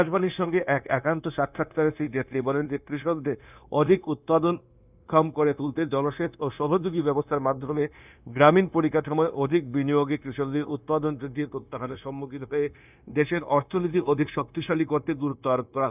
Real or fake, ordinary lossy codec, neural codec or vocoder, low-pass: fake; none; codec, 16 kHz, 0.9 kbps, LongCat-Audio-Codec; 3.6 kHz